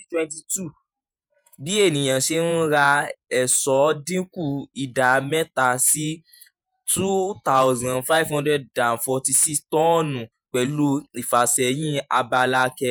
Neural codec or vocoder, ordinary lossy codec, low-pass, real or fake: vocoder, 48 kHz, 128 mel bands, Vocos; none; none; fake